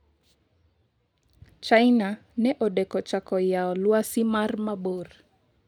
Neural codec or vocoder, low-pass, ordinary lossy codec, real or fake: none; 19.8 kHz; none; real